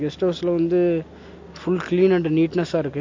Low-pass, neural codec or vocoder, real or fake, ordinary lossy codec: 7.2 kHz; none; real; MP3, 48 kbps